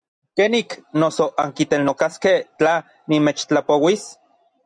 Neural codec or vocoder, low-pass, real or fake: none; 9.9 kHz; real